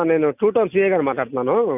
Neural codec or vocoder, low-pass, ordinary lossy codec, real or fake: none; 3.6 kHz; none; real